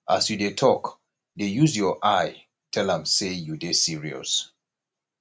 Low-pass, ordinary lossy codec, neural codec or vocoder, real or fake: none; none; none; real